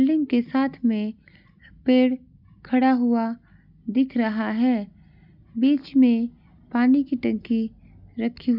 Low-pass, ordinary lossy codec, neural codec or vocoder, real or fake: 5.4 kHz; none; none; real